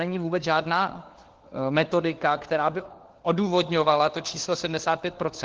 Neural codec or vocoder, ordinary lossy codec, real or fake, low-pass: codec, 16 kHz, 4 kbps, FunCodec, trained on LibriTTS, 50 frames a second; Opus, 16 kbps; fake; 7.2 kHz